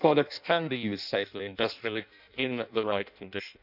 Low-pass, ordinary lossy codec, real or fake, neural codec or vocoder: 5.4 kHz; none; fake; codec, 16 kHz in and 24 kHz out, 0.6 kbps, FireRedTTS-2 codec